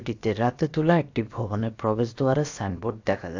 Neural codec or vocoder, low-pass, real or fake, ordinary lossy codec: codec, 16 kHz, about 1 kbps, DyCAST, with the encoder's durations; 7.2 kHz; fake; AAC, 48 kbps